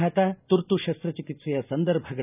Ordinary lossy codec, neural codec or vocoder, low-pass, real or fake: none; none; 3.6 kHz; real